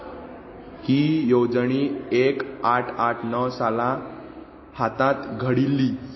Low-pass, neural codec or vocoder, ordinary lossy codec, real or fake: 7.2 kHz; none; MP3, 24 kbps; real